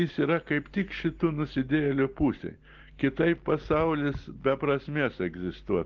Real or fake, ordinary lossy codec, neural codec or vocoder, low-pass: real; Opus, 16 kbps; none; 7.2 kHz